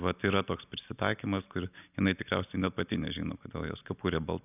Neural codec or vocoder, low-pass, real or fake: none; 3.6 kHz; real